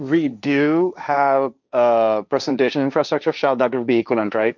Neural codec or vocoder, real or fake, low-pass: codec, 16 kHz, 1.1 kbps, Voila-Tokenizer; fake; 7.2 kHz